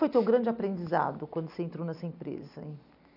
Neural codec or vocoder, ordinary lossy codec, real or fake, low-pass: none; none; real; 5.4 kHz